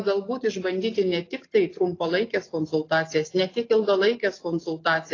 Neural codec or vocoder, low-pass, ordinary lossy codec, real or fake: none; 7.2 kHz; AAC, 32 kbps; real